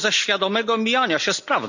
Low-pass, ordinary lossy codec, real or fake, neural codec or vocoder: 7.2 kHz; none; real; none